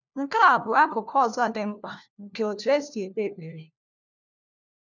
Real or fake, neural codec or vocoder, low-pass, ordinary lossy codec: fake; codec, 16 kHz, 1 kbps, FunCodec, trained on LibriTTS, 50 frames a second; 7.2 kHz; none